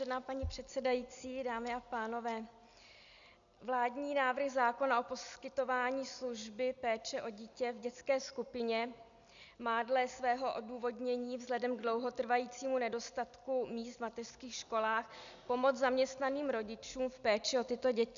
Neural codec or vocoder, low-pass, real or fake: none; 7.2 kHz; real